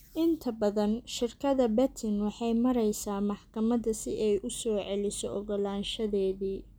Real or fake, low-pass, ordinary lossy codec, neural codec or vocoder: real; none; none; none